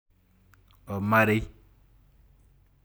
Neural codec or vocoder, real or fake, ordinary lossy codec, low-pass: none; real; none; none